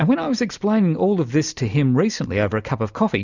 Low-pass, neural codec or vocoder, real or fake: 7.2 kHz; none; real